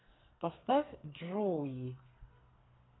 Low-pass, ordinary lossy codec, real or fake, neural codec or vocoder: 7.2 kHz; AAC, 16 kbps; fake; codec, 44.1 kHz, 2.6 kbps, SNAC